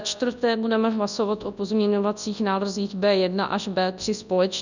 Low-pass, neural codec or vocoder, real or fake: 7.2 kHz; codec, 24 kHz, 0.9 kbps, WavTokenizer, large speech release; fake